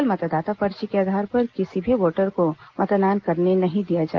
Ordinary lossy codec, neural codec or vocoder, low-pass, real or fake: Opus, 16 kbps; none; 7.2 kHz; real